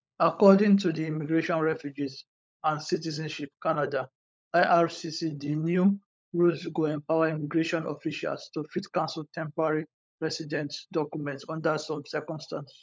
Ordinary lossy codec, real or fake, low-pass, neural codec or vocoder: none; fake; none; codec, 16 kHz, 16 kbps, FunCodec, trained on LibriTTS, 50 frames a second